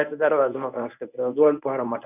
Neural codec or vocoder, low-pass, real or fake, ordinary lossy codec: codec, 24 kHz, 0.9 kbps, WavTokenizer, medium speech release version 1; 3.6 kHz; fake; none